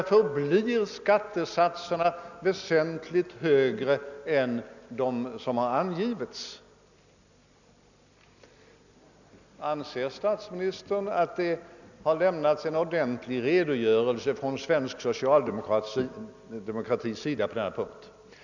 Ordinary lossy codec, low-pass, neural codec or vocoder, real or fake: none; 7.2 kHz; none; real